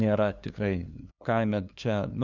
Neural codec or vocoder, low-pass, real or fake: codec, 16 kHz, 2 kbps, FunCodec, trained on LibriTTS, 25 frames a second; 7.2 kHz; fake